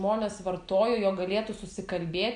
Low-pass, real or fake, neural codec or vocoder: 9.9 kHz; real; none